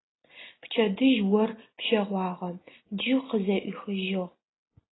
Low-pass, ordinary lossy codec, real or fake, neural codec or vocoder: 7.2 kHz; AAC, 16 kbps; real; none